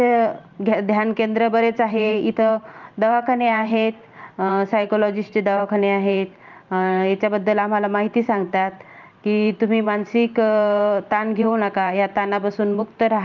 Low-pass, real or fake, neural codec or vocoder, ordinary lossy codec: 7.2 kHz; fake; vocoder, 44.1 kHz, 128 mel bands every 512 samples, BigVGAN v2; Opus, 24 kbps